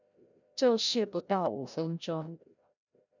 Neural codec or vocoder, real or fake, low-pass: codec, 16 kHz, 0.5 kbps, FreqCodec, larger model; fake; 7.2 kHz